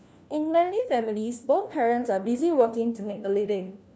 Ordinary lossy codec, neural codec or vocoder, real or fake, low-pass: none; codec, 16 kHz, 1 kbps, FunCodec, trained on LibriTTS, 50 frames a second; fake; none